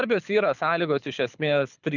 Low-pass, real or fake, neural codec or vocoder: 7.2 kHz; fake; codec, 24 kHz, 6 kbps, HILCodec